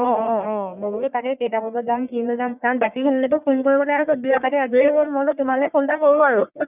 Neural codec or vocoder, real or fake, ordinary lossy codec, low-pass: codec, 44.1 kHz, 1.7 kbps, Pupu-Codec; fake; none; 3.6 kHz